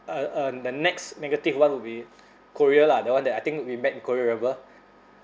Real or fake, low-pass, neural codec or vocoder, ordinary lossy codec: real; none; none; none